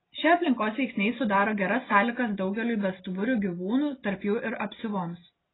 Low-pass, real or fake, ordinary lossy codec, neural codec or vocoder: 7.2 kHz; real; AAC, 16 kbps; none